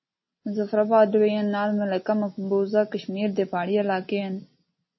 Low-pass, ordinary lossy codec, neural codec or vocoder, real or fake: 7.2 kHz; MP3, 24 kbps; none; real